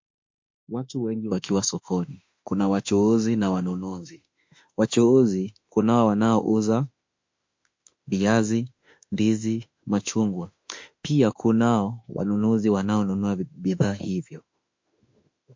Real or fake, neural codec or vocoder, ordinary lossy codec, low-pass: fake; autoencoder, 48 kHz, 32 numbers a frame, DAC-VAE, trained on Japanese speech; MP3, 48 kbps; 7.2 kHz